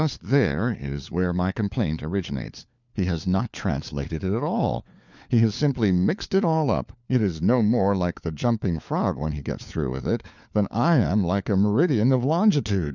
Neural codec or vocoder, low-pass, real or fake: codec, 16 kHz, 4 kbps, FunCodec, trained on LibriTTS, 50 frames a second; 7.2 kHz; fake